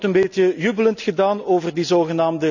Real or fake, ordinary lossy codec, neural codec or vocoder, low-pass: real; none; none; 7.2 kHz